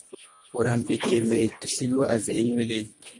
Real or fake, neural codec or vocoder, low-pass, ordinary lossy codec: fake; codec, 24 kHz, 1.5 kbps, HILCodec; 10.8 kHz; MP3, 48 kbps